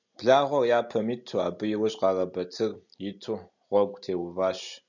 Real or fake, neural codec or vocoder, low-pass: real; none; 7.2 kHz